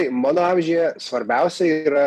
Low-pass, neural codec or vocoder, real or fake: 14.4 kHz; vocoder, 44.1 kHz, 128 mel bands every 256 samples, BigVGAN v2; fake